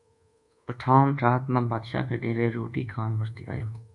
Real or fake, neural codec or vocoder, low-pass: fake; codec, 24 kHz, 1.2 kbps, DualCodec; 10.8 kHz